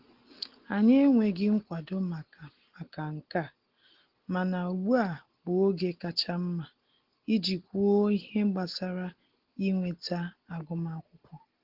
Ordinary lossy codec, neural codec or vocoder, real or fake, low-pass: Opus, 16 kbps; none; real; 5.4 kHz